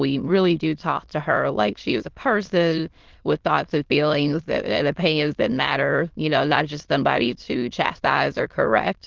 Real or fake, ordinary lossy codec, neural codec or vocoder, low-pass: fake; Opus, 16 kbps; autoencoder, 22.05 kHz, a latent of 192 numbers a frame, VITS, trained on many speakers; 7.2 kHz